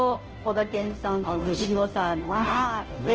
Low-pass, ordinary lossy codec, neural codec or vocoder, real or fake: 7.2 kHz; Opus, 16 kbps; codec, 16 kHz, 0.5 kbps, FunCodec, trained on Chinese and English, 25 frames a second; fake